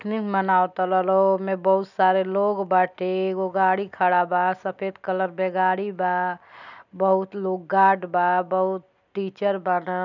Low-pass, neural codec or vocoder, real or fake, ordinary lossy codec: 7.2 kHz; none; real; none